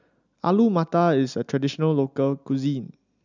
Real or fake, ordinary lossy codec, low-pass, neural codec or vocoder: real; none; 7.2 kHz; none